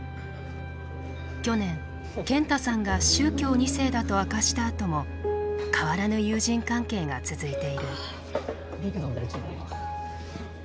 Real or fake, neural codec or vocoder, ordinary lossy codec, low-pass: real; none; none; none